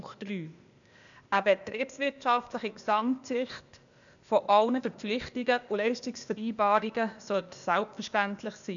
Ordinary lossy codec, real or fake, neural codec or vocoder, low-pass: none; fake; codec, 16 kHz, 0.8 kbps, ZipCodec; 7.2 kHz